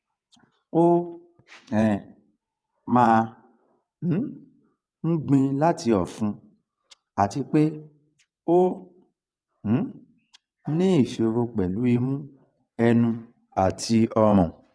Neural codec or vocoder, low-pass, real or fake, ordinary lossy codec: vocoder, 22.05 kHz, 80 mel bands, WaveNeXt; none; fake; none